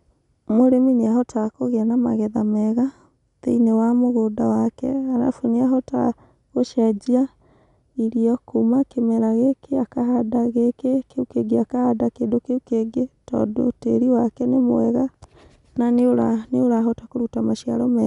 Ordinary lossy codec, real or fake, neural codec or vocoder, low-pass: none; real; none; 10.8 kHz